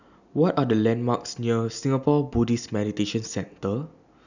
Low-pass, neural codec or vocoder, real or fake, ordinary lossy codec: 7.2 kHz; none; real; none